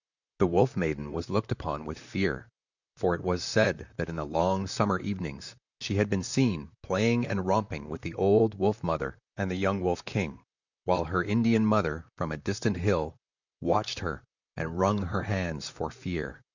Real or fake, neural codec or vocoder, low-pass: fake; vocoder, 44.1 kHz, 128 mel bands, Pupu-Vocoder; 7.2 kHz